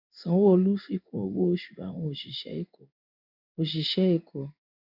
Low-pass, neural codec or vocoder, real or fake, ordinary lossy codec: 5.4 kHz; codec, 16 kHz in and 24 kHz out, 1 kbps, XY-Tokenizer; fake; none